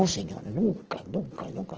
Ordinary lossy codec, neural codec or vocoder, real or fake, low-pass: Opus, 16 kbps; none; real; 7.2 kHz